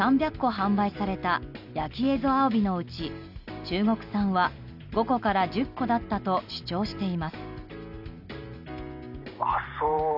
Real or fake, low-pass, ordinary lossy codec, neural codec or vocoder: real; 5.4 kHz; none; none